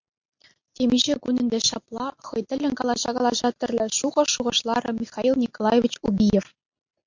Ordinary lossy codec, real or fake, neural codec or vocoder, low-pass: MP3, 48 kbps; real; none; 7.2 kHz